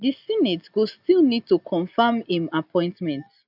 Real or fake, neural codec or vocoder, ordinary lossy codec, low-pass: real; none; none; 5.4 kHz